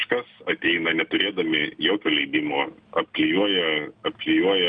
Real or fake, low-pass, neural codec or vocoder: real; 9.9 kHz; none